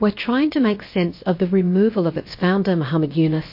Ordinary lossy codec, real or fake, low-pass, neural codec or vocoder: MP3, 32 kbps; fake; 5.4 kHz; codec, 16 kHz, about 1 kbps, DyCAST, with the encoder's durations